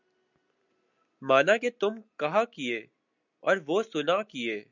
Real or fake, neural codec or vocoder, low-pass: real; none; 7.2 kHz